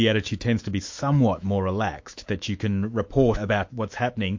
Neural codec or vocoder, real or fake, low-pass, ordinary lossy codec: autoencoder, 48 kHz, 128 numbers a frame, DAC-VAE, trained on Japanese speech; fake; 7.2 kHz; MP3, 48 kbps